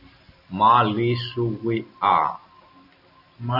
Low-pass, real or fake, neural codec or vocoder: 5.4 kHz; fake; vocoder, 44.1 kHz, 128 mel bands every 256 samples, BigVGAN v2